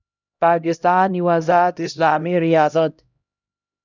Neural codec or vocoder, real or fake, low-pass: codec, 16 kHz, 0.5 kbps, X-Codec, HuBERT features, trained on LibriSpeech; fake; 7.2 kHz